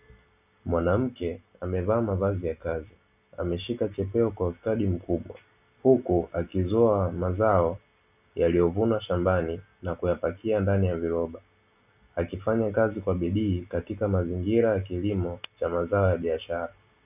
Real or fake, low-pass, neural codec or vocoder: real; 3.6 kHz; none